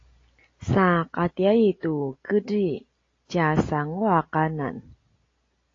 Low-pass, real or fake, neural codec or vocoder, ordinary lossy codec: 7.2 kHz; real; none; AAC, 32 kbps